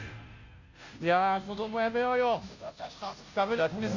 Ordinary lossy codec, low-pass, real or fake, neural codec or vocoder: Opus, 64 kbps; 7.2 kHz; fake; codec, 16 kHz, 0.5 kbps, FunCodec, trained on Chinese and English, 25 frames a second